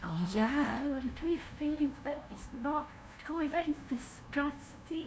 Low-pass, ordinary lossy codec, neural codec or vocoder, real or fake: none; none; codec, 16 kHz, 0.5 kbps, FunCodec, trained on LibriTTS, 25 frames a second; fake